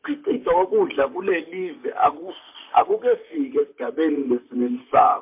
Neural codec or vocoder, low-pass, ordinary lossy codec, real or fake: vocoder, 44.1 kHz, 128 mel bands, Pupu-Vocoder; 3.6 kHz; MP3, 32 kbps; fake